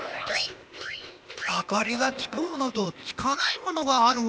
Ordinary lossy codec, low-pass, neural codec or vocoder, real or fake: none; none; codec, 16 kHz, 0.8 kbps, ZipCodec; fake